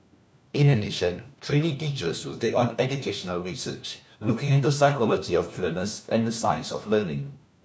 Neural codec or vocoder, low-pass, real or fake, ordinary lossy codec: codec, 16 kHz, 1 kbps, FunCodec, trained on LibriTTS, 50 frames a second; none; fake; none